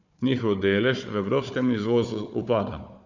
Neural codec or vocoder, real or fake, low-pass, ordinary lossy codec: codec, 16 kHz, 4 kbps, FunCodec, trained on Chinese and English, 50 frames a second; fake; 7.2 kHz; none